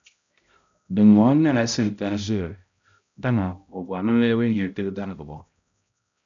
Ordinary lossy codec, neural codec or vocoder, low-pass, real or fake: MP3, 64 kbps; codec, 16 kHz, 0.5 kbps, X-Codec, HuBERT features, trained on balanced general audio; 7.2 kHz; fake